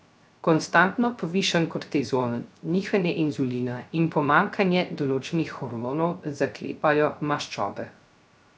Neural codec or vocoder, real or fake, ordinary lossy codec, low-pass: codec, 16 kHz, 0.3 kbps, FocalCodec; fake; none; none